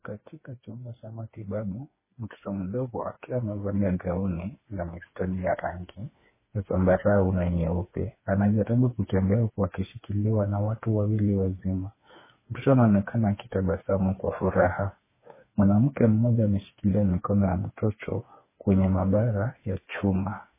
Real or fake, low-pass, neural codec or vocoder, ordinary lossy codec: fake; 3.6 kHz; codec, 16 kHz, 4 kbps, FreqCodec, smaller model; MP3, 16 kbps